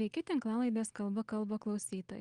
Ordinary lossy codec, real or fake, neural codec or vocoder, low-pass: Opus, 24 kbps; real; none; 9.9 kHz